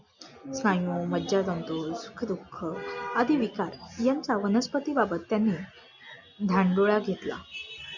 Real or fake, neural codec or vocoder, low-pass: real; none; 7.2 kHz